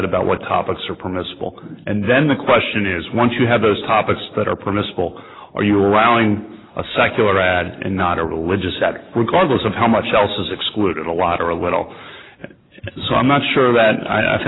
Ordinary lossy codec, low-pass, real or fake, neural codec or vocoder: AAC, 16 kbps; 7.2 kHz; real; none